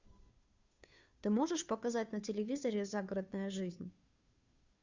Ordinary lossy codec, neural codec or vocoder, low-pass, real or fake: Opus, 64 kbps; codec, 16 kHz, 2 kbps, FunCodec, trained on Chinese and English, 25 frames a second; 7.2 kHz; fake